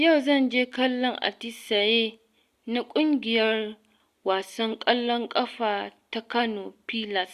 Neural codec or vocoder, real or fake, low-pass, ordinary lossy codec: none; real; 14.4 kHz; Opus, 64 kbps